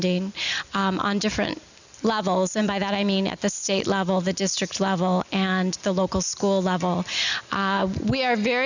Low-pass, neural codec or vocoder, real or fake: 7.2 kHz; none; real